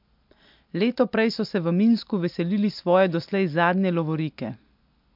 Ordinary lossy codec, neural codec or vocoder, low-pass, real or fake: MP3, 48 kbps; none; 5.4 kHz; real